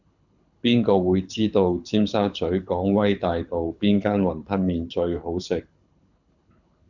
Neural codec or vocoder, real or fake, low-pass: codec, 24 kHz, 6 kbps, HILCodec; fake; 7.2 kHz